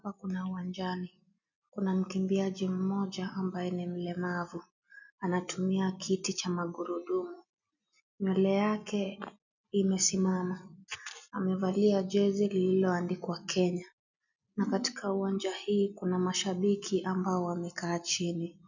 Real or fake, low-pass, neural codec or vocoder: real; 7.2 kHz; none